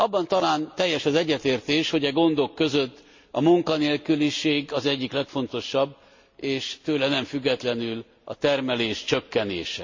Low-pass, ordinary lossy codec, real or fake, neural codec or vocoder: 7.2 kHz; MP3, 48 kbps; real; none